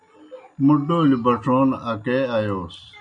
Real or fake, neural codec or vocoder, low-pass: real; none; 9.9 kHz